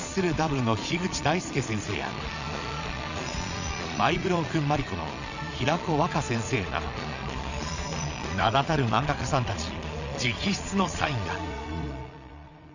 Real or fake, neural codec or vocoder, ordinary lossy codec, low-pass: fake; vocoder, 22.05 kHz, 80 mel bands, Vocos; none; 7.2 kHz